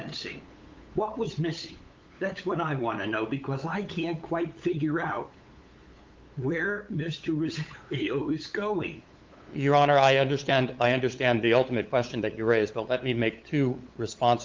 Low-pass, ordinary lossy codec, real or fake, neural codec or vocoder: 7.2 kHz; Opus, 32 kbps; fake; codec, 16 kHz, 8 kbps, FunCodec, trained on LibriTTS, 25 frames a second